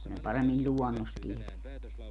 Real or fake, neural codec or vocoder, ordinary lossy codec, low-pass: real; none; none; 10.8 kHz